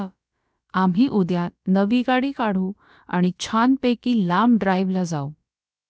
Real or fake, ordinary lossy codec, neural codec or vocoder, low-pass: fake; none; codec, 16 kHz, about 1 kbps, DyCAST, with the encoder's durations; none